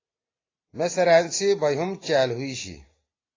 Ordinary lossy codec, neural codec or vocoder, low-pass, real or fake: AAC, 32 kbps; none; 7.2 kHz; real